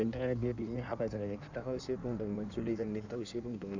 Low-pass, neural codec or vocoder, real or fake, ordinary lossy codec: 7.2 kHz; codec, 16 kHz in and 24 kHz out, 1.1 kbps, FireRedTTS-2 codec; fake; Opus, 64 kbps